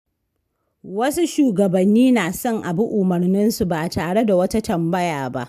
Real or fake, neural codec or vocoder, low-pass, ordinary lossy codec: real; none; 14.4 kHz; AAC, 96 kbps